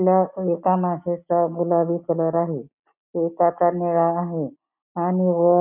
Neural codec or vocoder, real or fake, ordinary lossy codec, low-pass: none; real; none; 3.6 kHz